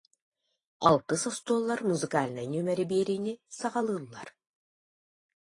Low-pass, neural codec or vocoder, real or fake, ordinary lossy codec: 10.8 kHz; vocoder, 44.1 kHz, 128 mel bands every 512 samples, BigVGAN v2; fake; AAC, 32 kbps